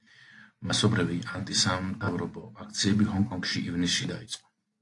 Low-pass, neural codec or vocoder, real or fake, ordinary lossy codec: 10.8 kHz; none; real; AAC, 48 kbps